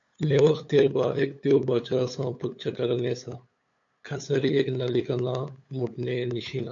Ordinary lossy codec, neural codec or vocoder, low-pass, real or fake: AAC, 48 kbps; codec, 16 kHz, 8 kbps, FunCodec, trained on LibriTTS, 25 frames a second; 7.2 kHz; fake